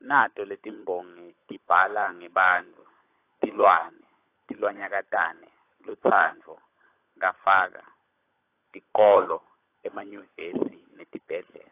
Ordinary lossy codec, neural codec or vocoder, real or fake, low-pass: AAC, 24 kbps; codec, 16 kHz, 16 kbps, FunCodec, trained on LibriTTS, 50 frames a second; fake; 3.6 kHz